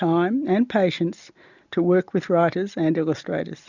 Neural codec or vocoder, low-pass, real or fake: none; 7.2 kHz; real